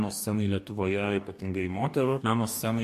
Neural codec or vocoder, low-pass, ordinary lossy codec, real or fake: codec, 44.1 kHz, 2.6 kbps, DAC; 14.4 kHz; MP3, 64 kbps; fake